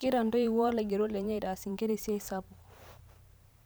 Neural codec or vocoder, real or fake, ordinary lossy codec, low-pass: vocoder, 44.1 kHz, 128 mel bands every 512 samples, BigVGAN v2; fake; none; none